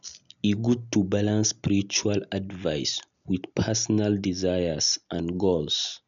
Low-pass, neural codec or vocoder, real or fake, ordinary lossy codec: 7.2 kHz; none; real; none